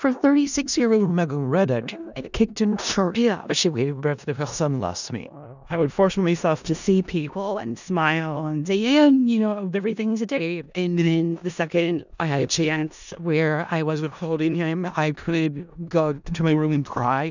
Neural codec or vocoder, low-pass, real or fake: codec, 16 kHz in and 24 kHz out, 0.4 kbps, LongCat-Audio-Codec, four codebook decoder; 7.2 kHz; fake